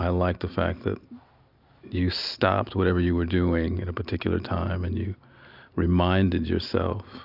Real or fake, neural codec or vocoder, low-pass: real; none; 5.4 kHz